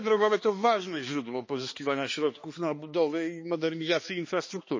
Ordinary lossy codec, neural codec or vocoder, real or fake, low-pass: MP3, 32 kbps; codec, 16 kHz, 2 kbps, X-Codec, HuBERT features, trained on balanced general audio; fake; 7.2 kHz